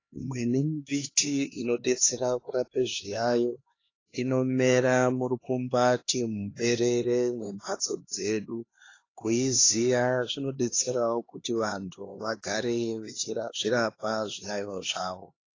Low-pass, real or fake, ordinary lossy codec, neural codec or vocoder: 7.2 kHz; fake; AAC, 32 kbps; codec, 16 kHz, 2 kbps, X-Codec, HuBERT features, trained on LibriSpeech